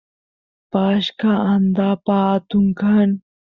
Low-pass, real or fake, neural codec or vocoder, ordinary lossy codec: 7.2 kHz; real; none; Opus, 64 kbps